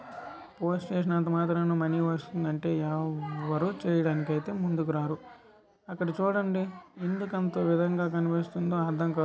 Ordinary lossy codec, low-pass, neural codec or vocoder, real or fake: none; none; none; real